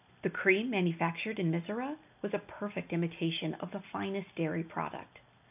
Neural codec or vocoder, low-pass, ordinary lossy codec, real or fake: none; 3.6 kHz; AAC, 32 kbps; real